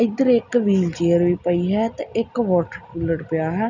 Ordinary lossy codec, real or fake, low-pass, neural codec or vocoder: none; real; 7.2 kHz; none